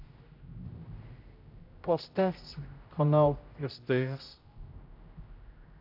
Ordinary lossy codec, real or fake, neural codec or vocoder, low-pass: AAC, 48 kbps; fake; codec, 16 kHz, 0.5 kbps, X-Codec, HuBERT features, trained on general audio; 5.4 kHz